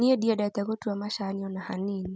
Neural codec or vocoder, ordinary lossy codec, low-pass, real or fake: none; none; none; real